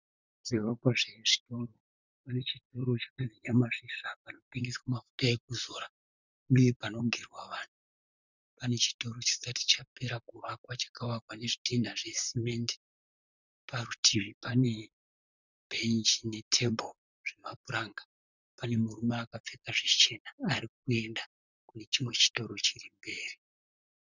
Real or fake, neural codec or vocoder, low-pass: fake; vocoder, 44.1 kHz, 128 mel bands, Pupu-Vocoder; 7.2 kHz